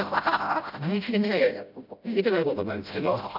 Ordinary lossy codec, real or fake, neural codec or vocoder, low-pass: none; fake; codec, 16 kHz, 0.5 kbps, FreqCodec, smaller model; 5.4 kHz